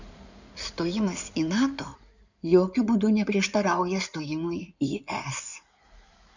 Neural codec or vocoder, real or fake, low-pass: codec, 16 kHz in and 24 kHz out, 2.2 kbps, FireRedTTS-2 codec; fake; 7.2 kHz